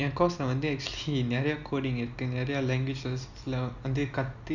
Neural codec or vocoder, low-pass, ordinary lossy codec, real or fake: none; 7.2 kHz; none; real